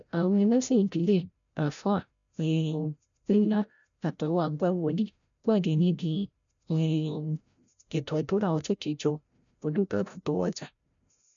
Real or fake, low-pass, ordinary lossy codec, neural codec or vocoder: fake; 7.2 kHz; none; codec, 16 kHz, 0.5 kbps, FreqCodec, larger model